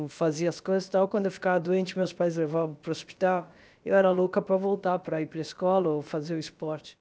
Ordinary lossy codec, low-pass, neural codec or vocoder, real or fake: none; none; codec, 16 kHz, about 1 kbps, DyCAST, with the encoder's durations; fake